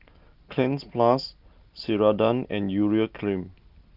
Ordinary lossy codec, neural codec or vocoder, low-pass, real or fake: Opus, 32 kbps; none; 5.4 kHz; real